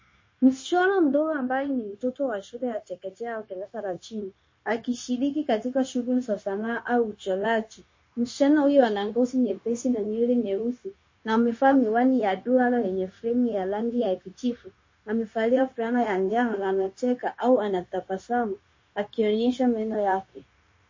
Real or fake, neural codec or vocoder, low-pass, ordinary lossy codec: fake; codec, 16 kHz, 0.9 kbps, LongCat-Audio-Codec; 7.2 kHz; MP3, 32 kbps